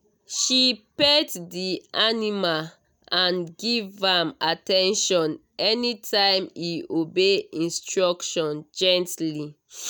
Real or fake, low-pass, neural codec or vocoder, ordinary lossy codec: real; none; none; none